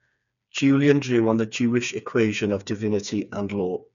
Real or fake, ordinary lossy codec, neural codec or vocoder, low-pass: fake; none; codec, 16 kHz, 4 kbps, FreqCodec, smaller model; 7.2 kHz